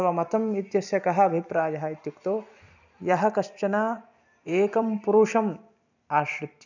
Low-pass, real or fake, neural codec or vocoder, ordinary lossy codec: 7.2 kHz; fake; vocoder, 44.1 kHz, 128 mel bands every 256 samples, BigVGAN v2; none